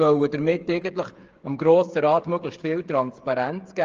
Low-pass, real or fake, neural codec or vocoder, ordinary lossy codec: 7.2 kHz; fake; codec, 16 kHz, 8 kbps, FreqCodec, smaller model; Opus, 24 kbps